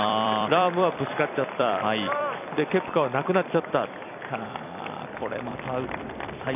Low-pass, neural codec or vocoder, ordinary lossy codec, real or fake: 3.6 kHz; vocoder, 44.1 kHz, 128 mel bands every 256 samples, BigVGAN v2; none; fake